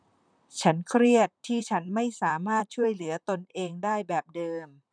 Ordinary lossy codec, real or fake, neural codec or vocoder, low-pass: none; fake; vocoder, 44.1 kHz, 128 mel bands, Pupu-Vocoder; 9.9 kHz